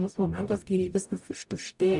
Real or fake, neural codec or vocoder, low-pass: fake; codec, 44.1 kHz, 0.9 kbps, DAC; 10.8 kHz